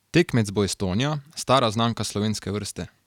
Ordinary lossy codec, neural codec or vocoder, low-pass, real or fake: none; none; 19.8 kHz; real